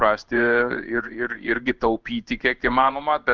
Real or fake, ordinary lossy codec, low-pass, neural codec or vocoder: fake; Opus, 24 kbps; 7.2 kHz; codec, 16 kHz in and 24 kHz out, 1 kbps, XY-Tokenizer